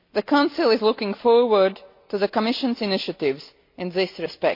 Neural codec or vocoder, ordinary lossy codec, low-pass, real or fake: none; MP3, 32 kbps; 5.4 kHz; real